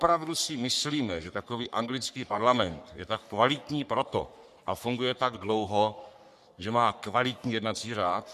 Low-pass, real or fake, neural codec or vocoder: 14.4 kHz; fake; codec, 44.1 kHz, 3.4 kbps, Pupu-Codec